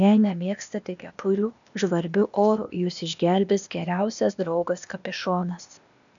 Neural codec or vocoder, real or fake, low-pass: codec, 16 kHz, 0.8 kbps, ZipCodec; fake; 7.2 kHz